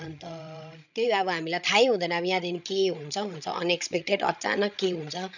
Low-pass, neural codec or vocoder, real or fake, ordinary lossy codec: 7.2 kHz; codec, 16 kHz, 16 kbps, FreqCodec, larger model; fake; none